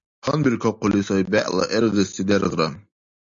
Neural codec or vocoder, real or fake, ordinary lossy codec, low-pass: none; real; MP3, 48 kbps; 7.2 kHz